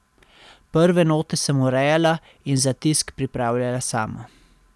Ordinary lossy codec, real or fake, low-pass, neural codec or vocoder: none; real; none; none